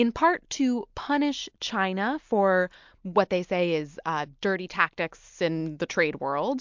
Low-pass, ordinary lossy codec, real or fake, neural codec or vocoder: 7.2 kHz; MP3, 64 kbps; real; none